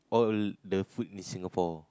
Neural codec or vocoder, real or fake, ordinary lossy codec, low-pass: none; real; none; none